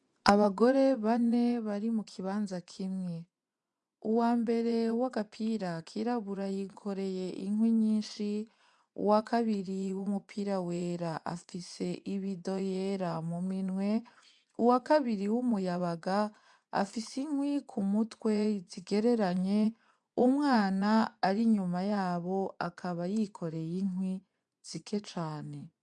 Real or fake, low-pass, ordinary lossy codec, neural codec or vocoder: fake; 10.8 kHz; MP3, 96 kbps; vocoder, 24 kHz, 100 mel bands, Vocos